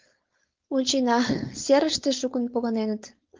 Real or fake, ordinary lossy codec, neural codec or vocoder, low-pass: fake; Opus, 32 kbps; codec, 16 kHz, 4.8 kbps, FACodec; 7.2 kHz